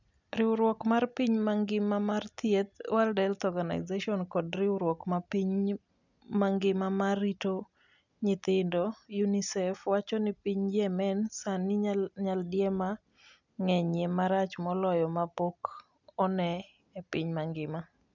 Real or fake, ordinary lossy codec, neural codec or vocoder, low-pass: real; none; none; 7.2 kHz